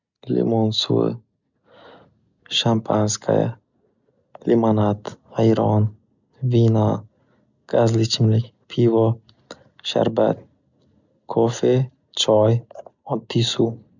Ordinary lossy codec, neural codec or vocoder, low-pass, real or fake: none; none; 7.2 kHz; real